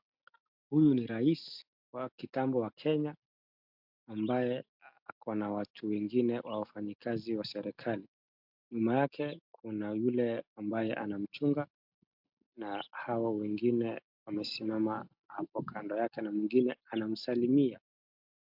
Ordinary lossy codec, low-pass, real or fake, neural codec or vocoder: MP3, 48 kbps; 5.4 kHz; real; none